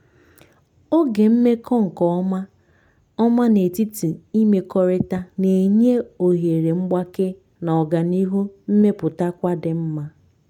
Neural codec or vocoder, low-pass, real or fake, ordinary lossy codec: none; 19.8 kHz; real; none